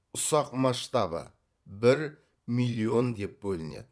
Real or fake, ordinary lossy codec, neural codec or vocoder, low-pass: fake; none; vocoder, 22.05 kHz, 80 mel bands, Vocos; none